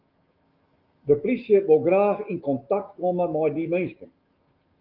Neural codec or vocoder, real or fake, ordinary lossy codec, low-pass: autoencoder, 48 kHz, 128 numbers a frame, DAC-VAE, trained on Japanese speech; fake; Opus, 24 kbps; 5.4 kHz